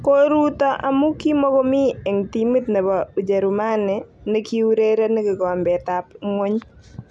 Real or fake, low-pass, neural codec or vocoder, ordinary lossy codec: real; none; none; none